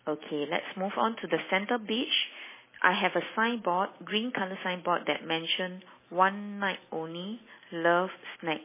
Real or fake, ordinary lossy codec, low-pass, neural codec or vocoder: real; MP3, 16 kbps; 3.6 kHz; none